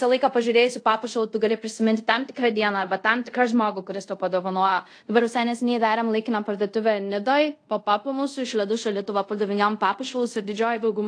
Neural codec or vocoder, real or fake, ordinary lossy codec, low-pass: codec, 24 kHz, 0.5 kbps, DualCodec; fake; AAC, 48 kbps; 9.9 kHz